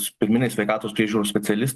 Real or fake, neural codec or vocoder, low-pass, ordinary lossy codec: real; none; 14.4 kHz; Opus, 24 kbps